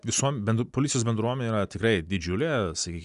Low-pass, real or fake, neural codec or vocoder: 10.8 kHz; real; none